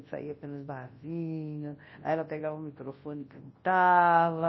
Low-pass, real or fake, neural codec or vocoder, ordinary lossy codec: 7.2 kHz; fake; codec, 24 kHz, 0.9 kbps, WavTokenizer, large speech release; MP3, 24 kbps